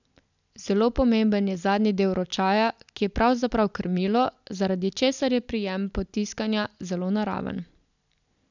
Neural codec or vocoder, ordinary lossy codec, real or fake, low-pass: none; none; real; 7.2 kHz